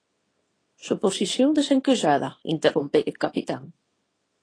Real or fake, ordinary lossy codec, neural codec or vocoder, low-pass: fake; AAC, 32 kbps; codec, 24 kHz, 0.9 kbps, WavTokenizer, small release; 9.9 kHz